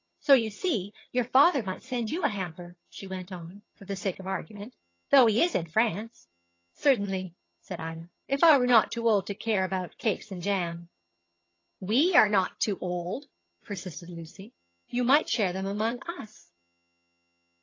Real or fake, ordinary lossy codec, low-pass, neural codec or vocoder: fake; AAC, 32 kbps; 7.2 kHz; vocoder, 22.05 kHz, 80 mel bands, HiFi-GAN